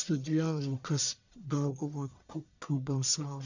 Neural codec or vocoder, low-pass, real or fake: codec, 44.1 kHz, 1.7 kbps, Pupu-Codec; 7.2 kHz; fake